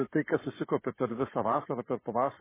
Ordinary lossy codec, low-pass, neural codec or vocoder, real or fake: MP3, 16 kbps; 3.6 kHz; codec, 44.1 kHz, 7.8 kbps, Pupu-Codec; fake